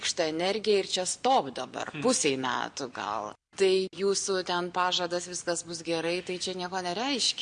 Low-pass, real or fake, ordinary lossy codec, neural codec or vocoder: 9.9 kHz; real; Opus, 64 kbps; none